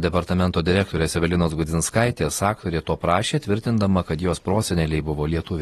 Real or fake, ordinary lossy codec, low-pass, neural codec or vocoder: real; AAC, 32 kbps; 19.8 kHz; none